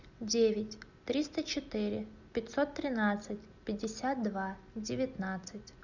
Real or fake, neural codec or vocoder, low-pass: real; none; 7.2 kHz